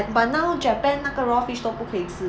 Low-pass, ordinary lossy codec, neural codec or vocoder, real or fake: none; none; none; real